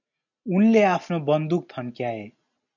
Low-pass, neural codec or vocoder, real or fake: 7.2 kHz; none; real